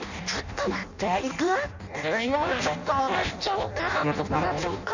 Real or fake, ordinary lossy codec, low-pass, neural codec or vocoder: fake; none; 7.2 kHz; codec, 16 kHz in and 24 kHz out, 0.6 kbps, FireRedTTS-2 codec